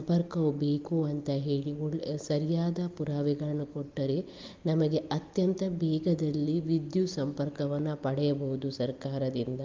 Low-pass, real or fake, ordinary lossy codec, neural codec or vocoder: 7.2 kHz; real; Opus, 32 kbps; none